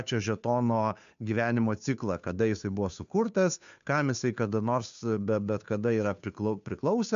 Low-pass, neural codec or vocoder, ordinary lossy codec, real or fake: 7.2 kHz; codec, 16 kHz, 2 kbps, FunCodec, trained on Chinese and English, 25 frames a second; MP3, 64 kbps; fake